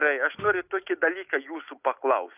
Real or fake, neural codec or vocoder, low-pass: real; none; 3.6 kHz